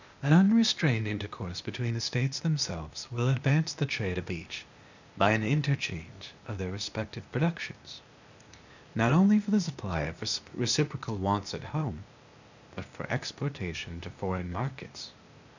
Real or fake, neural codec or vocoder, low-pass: fake; codec, 16 kHz, 0.8 kbps, ZipCodec; 7.2 kHz